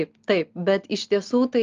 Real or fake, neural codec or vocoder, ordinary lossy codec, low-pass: real; none; Opus, 32 kbps; 7.2 kHz